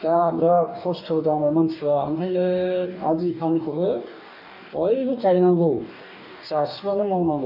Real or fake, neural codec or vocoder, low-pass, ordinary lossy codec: fake; codec, 44.1 kHz, 2.6 kbps, DAC; 5.4 kHz; none